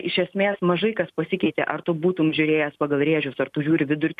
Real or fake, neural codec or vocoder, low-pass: real; none; 14.4 kHz